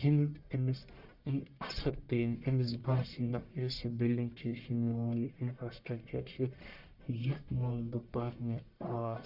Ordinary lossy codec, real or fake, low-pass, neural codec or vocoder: none; fake; 5.4 kHz; codec, 44.1 kHz, 1.7 kbps, Pupu-Codec